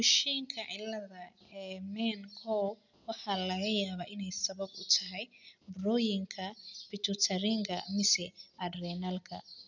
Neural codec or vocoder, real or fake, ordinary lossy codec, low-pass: none; real; none; 7.2 kHz